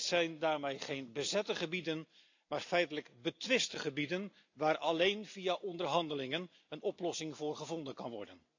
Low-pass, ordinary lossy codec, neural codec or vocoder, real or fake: 7.2 kHz; AAC, 48 kbps; none; real